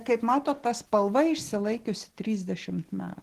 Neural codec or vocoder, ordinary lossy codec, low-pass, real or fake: none; Opus, 16 kbps; 14.4 kHz; real